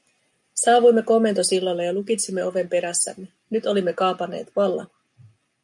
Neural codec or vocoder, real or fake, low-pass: none; real; 10.8 kHz